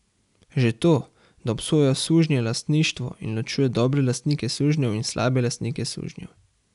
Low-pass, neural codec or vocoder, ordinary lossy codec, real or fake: 10.8 kHz; none; none; real